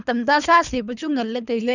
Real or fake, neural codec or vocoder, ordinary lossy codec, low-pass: fake; codec, 24 kHz, 3 kbps, HILCodec; none; 7.2 kHz